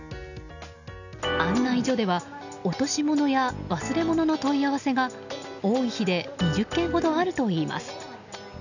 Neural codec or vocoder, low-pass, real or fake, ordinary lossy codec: none; 7.2 kHz; real; none